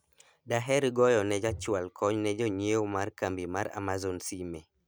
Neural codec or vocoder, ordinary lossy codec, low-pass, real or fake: none; none; none; real